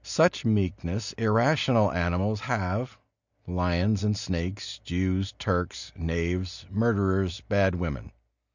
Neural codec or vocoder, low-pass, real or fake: none; 7.2 kHz; real